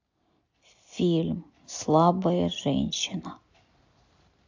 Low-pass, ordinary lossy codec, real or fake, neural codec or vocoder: 7.2 kHz; AAC, 48 kbps; real; none